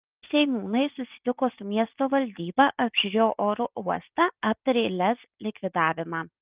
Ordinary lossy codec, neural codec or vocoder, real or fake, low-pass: Opus, 32 kbps; none; real; 3.6 kHz